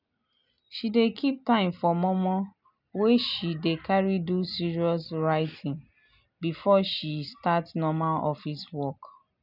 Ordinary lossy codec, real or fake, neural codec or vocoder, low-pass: none; real; none; 5.4 kHz